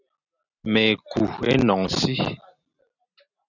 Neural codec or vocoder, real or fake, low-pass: none; real; 7.2 kHz